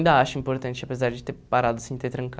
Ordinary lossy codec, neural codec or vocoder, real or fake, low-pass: none; none; real; none